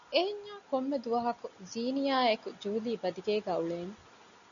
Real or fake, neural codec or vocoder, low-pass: real; none; 7.2 kHz